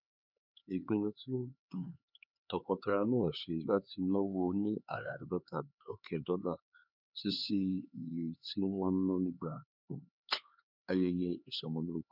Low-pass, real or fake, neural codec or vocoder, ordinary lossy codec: 5.4 kHz; fake; codec, 16 kHz, 4 kbps, X-Codec, HuBERT features, trained on LibriSpeech; none